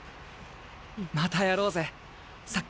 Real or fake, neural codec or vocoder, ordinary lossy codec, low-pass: real; none; none; none